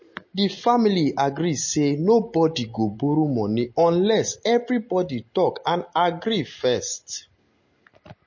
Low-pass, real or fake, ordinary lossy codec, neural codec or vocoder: 7.2 kHz; real; MP3, 32 kbps; none